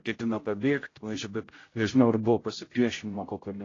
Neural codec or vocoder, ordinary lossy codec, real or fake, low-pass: codec, 16 kHz, 0.5 kbps, X-Codec, HuBERT features, trained on general audio; AAC, 32 kbps; fake; 7.2 kHz